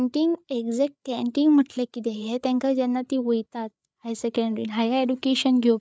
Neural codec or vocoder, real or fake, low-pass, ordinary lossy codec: codec, 16 kHz, 8 kbps, FreqCodec, larger model; fake; none; none